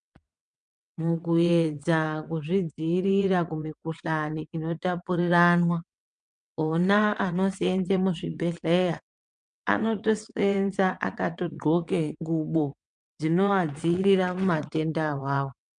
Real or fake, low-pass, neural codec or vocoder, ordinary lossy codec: fake; 9.9 kHz; vocoder, 22.05 kHz, 80 mel bands, WaveNeXt; MP3, 64 kbps